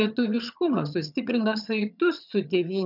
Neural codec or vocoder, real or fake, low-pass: vocoder, 22.05 kHz, 80 mel bands, HiFi-GAN; fake; 5.4 kHz